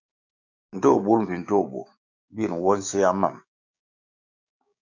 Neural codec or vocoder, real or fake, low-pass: codec, 16 kHz, 6 kbps, DAC; fake; 7.2 kHz